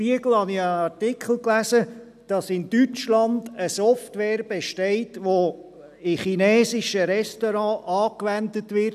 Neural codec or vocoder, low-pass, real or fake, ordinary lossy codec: none; 14.4 kHz; real; none